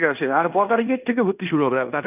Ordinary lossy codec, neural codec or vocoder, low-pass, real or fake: none; codec, 16 kHz in and 24 kHz out, 0.9 kbps, LongCat-Audio-Codec, fine tuned four codebook decoder; 3.6 kHz; fake